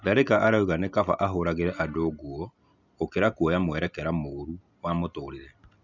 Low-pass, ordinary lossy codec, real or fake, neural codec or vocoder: 7.2 kHz; none; real; none